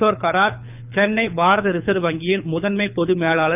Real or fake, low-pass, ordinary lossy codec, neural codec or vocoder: fake; 3.6 kHz; none; codec, 24 kHz, 6 kbps, HILCodec